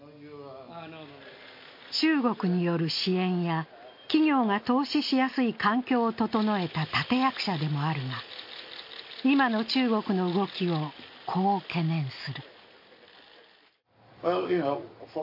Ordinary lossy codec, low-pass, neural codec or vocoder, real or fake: MP3, 32 kbps; 5.4 kHz; none; real